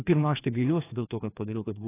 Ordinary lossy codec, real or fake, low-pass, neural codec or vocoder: AAC, 16 kbps; fake; 3.6 kHz; codec, 16 kHz, 2 kbps, FreqCodec, larger model